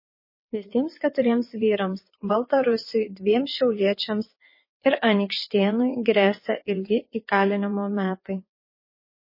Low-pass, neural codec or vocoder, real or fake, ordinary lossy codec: 5.4 kHz; vocoder, 22.05 kHz, 80 mel bands, WaveNeXt; fake; MP3, 24 kbps